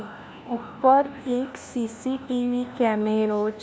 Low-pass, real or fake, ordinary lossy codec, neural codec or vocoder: none; fake; none; codec, 16 kHz, 1 kbps, FunCodec, trained on LibriTTS, 50 frames a second